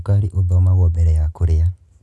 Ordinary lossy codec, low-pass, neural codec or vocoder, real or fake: none; none; none; real